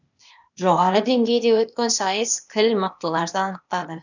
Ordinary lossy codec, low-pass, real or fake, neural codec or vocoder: none; 7.2 kHz; fake; codec, 16 kHz, 0.8 kbps, ZipCodec